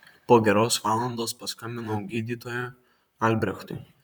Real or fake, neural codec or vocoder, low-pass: fake; vocoder, 44.1 kHz, 128 mel bands, Pupu-Vocoder; 19.8 kHz